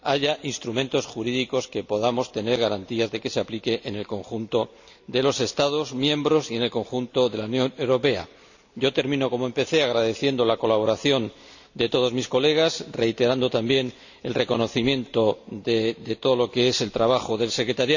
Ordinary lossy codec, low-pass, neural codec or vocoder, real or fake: none; 7.2 kHz; none; real